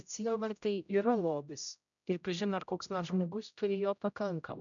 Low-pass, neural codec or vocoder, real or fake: 7.2 kHz; codec, 16 kHz, 0.5 kbps, X-Codec, HuBERT features, trained on general audio; fake